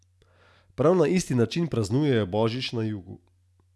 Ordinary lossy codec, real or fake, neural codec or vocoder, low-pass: none; real; none; none